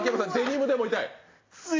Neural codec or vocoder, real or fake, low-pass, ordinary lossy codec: none; real; 7.2 kHz; AAC, 32 kbps